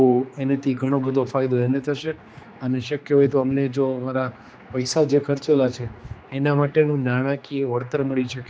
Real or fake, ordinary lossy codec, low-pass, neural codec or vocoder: fake; none; none; codec, 16 kHz, 2 kbps, X-Codec, HuBERT features, trained on general audio